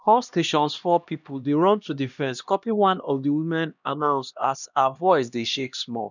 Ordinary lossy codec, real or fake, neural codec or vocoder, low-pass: none; fake; codec, 16 kHz, 1 kbps, X-Codec, HuBERT features, trained on LibriSpeech; 7.2 kHz